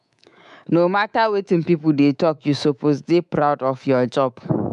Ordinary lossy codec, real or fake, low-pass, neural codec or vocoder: none; fake; 10.8 kHz; codec, 24 kHz, 3.1 kbps, DualCodec